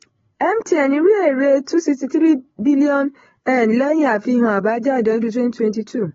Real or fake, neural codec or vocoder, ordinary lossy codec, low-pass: fake; codec, 16 kHz, 8 kbps, FreqCodec, larger model; AAC, 24 kbps; 7.2 kHz